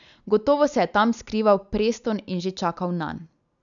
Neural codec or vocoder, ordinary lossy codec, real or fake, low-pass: none; none; real; 7.2 kHz